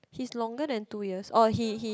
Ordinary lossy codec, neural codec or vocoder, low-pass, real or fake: none; none; none; real